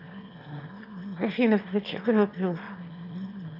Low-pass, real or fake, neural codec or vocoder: 5.4 kHz; fake; autoencoder, 22.05 kHz, a latent of 192 numbers a frame, VITS, trained on one speaker